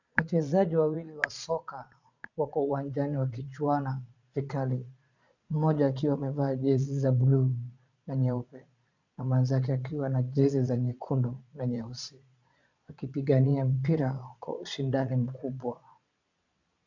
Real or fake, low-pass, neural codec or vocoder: fake; 7.2 kHz; vocoder, 22.05 kHz, 80 mel bands, Vocos